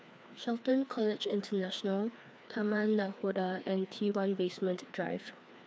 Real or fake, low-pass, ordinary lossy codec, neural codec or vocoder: fake; none; none; codec, 16 kHz, 2 kbps, FreqCodec, larger model